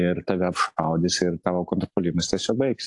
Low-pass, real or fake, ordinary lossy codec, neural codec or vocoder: 10.8 kHz; real; AAC, 64 kbps; none